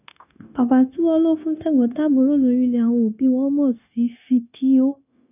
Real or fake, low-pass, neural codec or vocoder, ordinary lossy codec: fake; 3.6 kHz; codec, 16 kHz, 0.9 kbps, LongCat-Audio-Codec; none